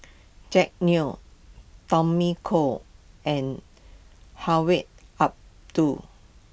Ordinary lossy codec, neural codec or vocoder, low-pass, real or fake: none; none; none; real